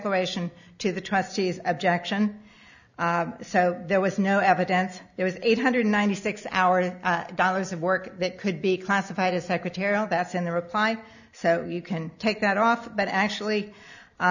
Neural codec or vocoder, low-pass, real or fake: none; 7.2 kHz; real